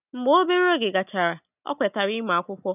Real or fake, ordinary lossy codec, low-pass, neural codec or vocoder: real; none; 3.6 kHz; none